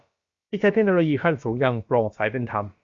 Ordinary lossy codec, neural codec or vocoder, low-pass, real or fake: Opus, 64 kbps; codec, 16 kHz, about 1 kbps, DyCAST, with the encoder's durations; 7.2 kHz; fake